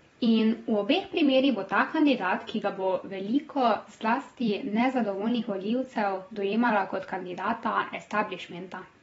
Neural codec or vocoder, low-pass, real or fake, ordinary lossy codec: vocoder, 44.1 kHz, 128 mel bands every 256 samples, BigVGAN v2; 19.8 kHz; fake; AAC, 24 kbps